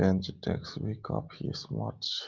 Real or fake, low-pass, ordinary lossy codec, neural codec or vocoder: real; 7.2 kHz; Opus, 24 kbps; none